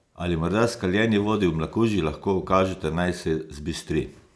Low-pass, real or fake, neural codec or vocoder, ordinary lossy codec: none; real; none; none